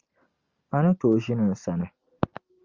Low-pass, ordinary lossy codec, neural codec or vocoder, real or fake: 7.2 kHz; Opus, 32 kbps; none; real